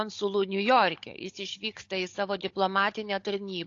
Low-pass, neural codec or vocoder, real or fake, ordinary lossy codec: 7.2 kHz; codec, 16 kHz, 16 kbps, FunCodec, trained on Chinese and English, 50 frames a second; fake; AAC, 48 kbps